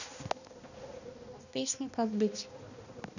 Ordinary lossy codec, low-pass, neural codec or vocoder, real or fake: none; 7.2 kHz; codec, 16 kHz, 1 kbps, X-Codec, HuBERT features, trained on balanced general audio; fake